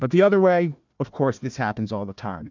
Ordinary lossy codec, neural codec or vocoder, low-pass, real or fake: MP3, 64 kbps; codec, 16 kHz, 1 kbps, FunCodec, trained on Chinese and English, 50 frames a second; 7.2 kHz; fake